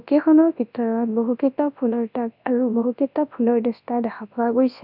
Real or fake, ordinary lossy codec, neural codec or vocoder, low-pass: fake; none; codec, 24 kHz, 0.9 kbps, WavTokenizer, large speech release; 5.4 kHz